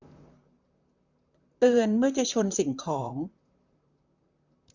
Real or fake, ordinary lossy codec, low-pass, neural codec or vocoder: fake; none; 7.2 kHz; vocoder, 44.1 kHz, 128 mel bands, Pupu-Vocoder